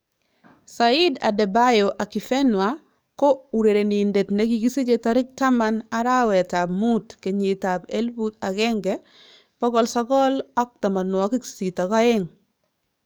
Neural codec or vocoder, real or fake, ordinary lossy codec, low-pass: codec, 44.1 kHz, 7.8 kbps, DAC; fake; none; none